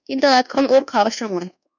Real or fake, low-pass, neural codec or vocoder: fake; 7.2 kHz; codec, 24 kHz, 1.2 kbps, DualCodec